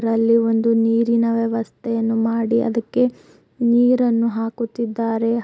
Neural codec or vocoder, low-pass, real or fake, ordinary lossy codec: none; none; real; none